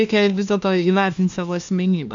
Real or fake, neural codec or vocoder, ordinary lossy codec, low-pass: fake; codec, 16 kHz, 1 kbps, FunCodec, trained on LibriTTS, 50 frames a second; MP3, 64 kbps; 7.2 kHz